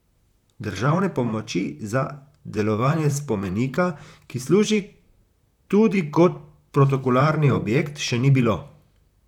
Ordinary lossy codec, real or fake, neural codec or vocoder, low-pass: none; fake; vocoder, 44.1 kHz, 128 mel bands, Pupu-Vocoder; 19.8 kHz